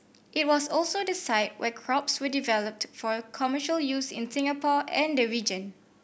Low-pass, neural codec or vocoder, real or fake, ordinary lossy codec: none; none; real; none